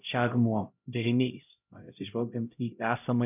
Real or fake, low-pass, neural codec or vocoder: fake; 3.6 kHz; codec, 16 kHz, 0.5 kbps, X-Codec, HuBERT features, trained on LibriSpeech